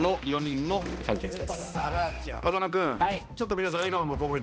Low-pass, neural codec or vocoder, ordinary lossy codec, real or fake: none; codec, 16 kHz, 1 kbps, X-Codec, HuBERT features, trained on balanced general audio; none; fake